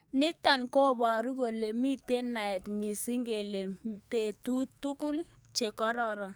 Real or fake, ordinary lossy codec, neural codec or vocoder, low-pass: fake; none; codec, 44.1 kHz, 2.6 kbps, SNAC; none